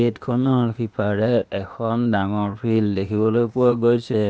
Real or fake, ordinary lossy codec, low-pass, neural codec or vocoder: fake; none; none; codec, 16 kHz, 0.8 kbps, ZipCodec